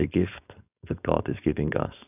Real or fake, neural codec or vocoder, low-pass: fake; codec, 16 kHz, 4.8 kbps, FACodec; 3.6 kHz